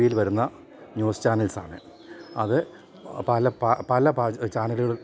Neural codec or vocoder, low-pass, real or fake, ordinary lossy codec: none; none; real; none